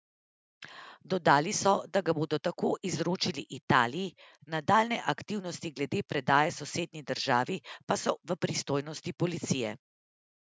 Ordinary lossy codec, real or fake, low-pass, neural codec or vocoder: none; real; none; none